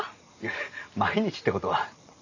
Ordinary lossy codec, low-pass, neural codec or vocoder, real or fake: none; 7.2 kHz; none; real